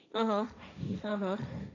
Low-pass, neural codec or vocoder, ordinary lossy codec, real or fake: 7.2 kHz; codec, 16 kHz, 1.1 kbps, Voila-Tokenizer; none; fake